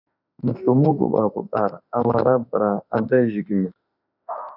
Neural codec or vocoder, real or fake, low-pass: autoencoder, 48 kHz, 32 numbers a frame, DAC-VAE, trained on Japanese speech; fake; 5.4 kHz